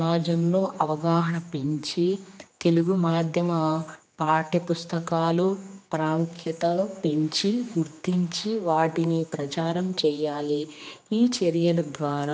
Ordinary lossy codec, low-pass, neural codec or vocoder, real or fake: none; none; codec, 16 kHz, 2 kbps, X-Codec, HuBERT features, trained on general audio; fake